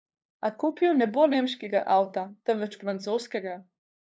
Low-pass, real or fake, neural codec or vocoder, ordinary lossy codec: none; fake; codec, 16 kHz, 2 kbps, FunCodec, trained on LibriTTS, 25 frames a second; none